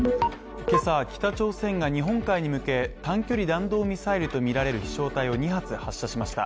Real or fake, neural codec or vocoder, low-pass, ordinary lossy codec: real; none; none; none